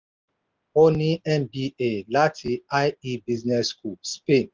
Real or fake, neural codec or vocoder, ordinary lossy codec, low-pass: real; none; Opus, 32 kbps; 7.2 kHz